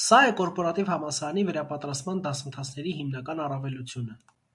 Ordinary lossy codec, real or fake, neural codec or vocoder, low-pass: MP3, 64 kbps; real; none; 10.8 kHz